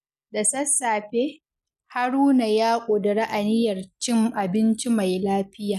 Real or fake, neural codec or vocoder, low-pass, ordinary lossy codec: real; none; 14.4 kHz; none